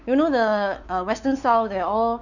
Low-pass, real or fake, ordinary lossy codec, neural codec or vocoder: 7.2 kHz; fake; none; codec, 16 kHz, 4 kbps, X-Codec, WavLM features, trained on Multilingual LibriSpeech